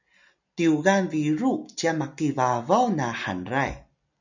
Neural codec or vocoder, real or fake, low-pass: none; real; 7.2 kHz